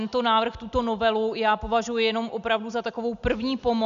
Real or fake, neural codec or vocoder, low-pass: real; none; 7.2 kHz